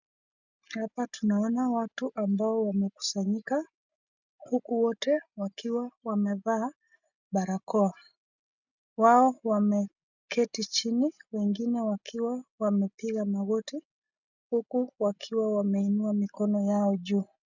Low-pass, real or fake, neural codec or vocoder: 7.2 kHz; real; none